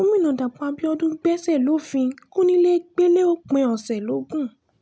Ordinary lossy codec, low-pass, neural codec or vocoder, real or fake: none; none; none; real